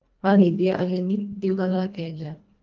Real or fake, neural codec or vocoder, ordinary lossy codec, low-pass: fake; codec, 24 kHz, 1.5 kbps, HILCodec; Opus, 24 kbps; 7.2 kHz